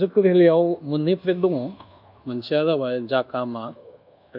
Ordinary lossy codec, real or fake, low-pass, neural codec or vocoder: AAC, 48 kbps; fake; 5.4 kHz; codec, 24 kHz, 1.2 kbps, DualCodec